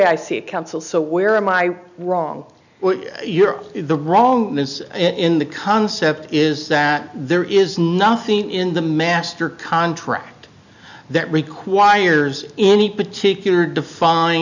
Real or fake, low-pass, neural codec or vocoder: real; 7.2 kHz; none